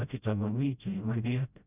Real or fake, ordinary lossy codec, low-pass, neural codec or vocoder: fake; none; 3.6 kHz; codec, 16 kHz, 0.5 kbps, FreqCodec, smaller model